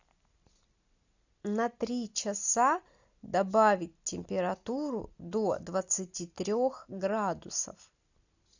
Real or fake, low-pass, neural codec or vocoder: real; 7.2 kHz; none